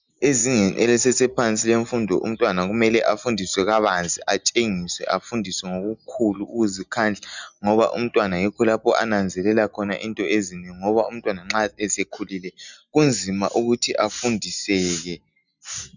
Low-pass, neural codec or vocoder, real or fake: 7.2 kHz; none; real